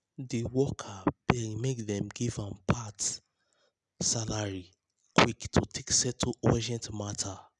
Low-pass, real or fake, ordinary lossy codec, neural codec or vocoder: 10.8 kHz; real; none; none